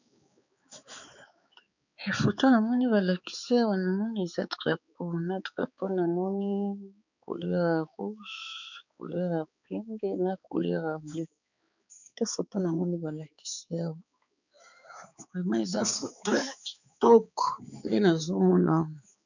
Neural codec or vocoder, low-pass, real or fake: codec, 16 kHz, 4 kbps, X-Codec, HuBERT features, trained on balanced general audio; 7.2 kHz; fake